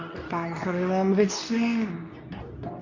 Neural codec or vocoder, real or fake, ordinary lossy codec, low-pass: codec, 24 kHz, 0.9 kbps, WavTokenizer, medium speech release version 2; fake; none; 7.2 kHz